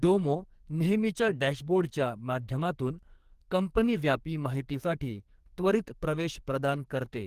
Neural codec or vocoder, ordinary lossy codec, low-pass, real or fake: codec, 44.1 kHz, 2.6 kbps, SNAC; Opus, 24 kbps; 14.4 kHz; fake